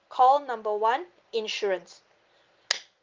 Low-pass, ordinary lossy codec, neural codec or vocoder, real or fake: 7.2 kHz; Opus, 24 kbps; none; real